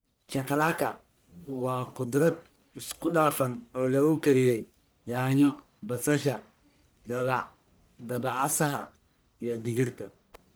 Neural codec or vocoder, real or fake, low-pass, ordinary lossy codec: codec, 44.1 kHz, 1.7 kbps, Pupu-Codec; fake; none; none